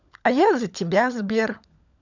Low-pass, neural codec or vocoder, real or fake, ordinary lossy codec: 7.2 kHz; codec, 16 kHz, 16 kbps, FunCodec, trained on LibriTTS, 50 frames a second; fake; none